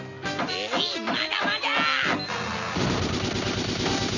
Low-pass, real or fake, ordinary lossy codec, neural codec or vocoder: 7.2 kHz; real; none; none